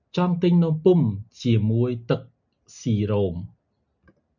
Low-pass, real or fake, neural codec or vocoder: 7.2 kHz; real; none